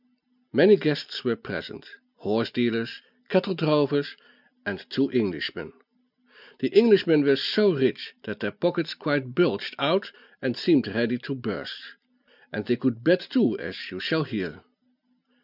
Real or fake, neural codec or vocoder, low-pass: real; none; 5.4 kHz